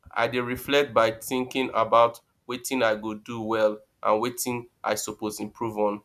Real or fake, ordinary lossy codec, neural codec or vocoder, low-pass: real; none; none; 14.4 kHz